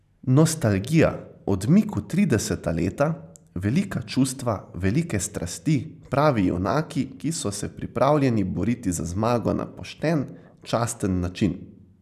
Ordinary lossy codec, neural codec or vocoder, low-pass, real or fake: none; none; 14.4 kHz; real